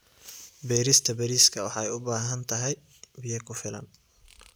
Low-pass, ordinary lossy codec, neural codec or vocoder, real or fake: none; none; none; real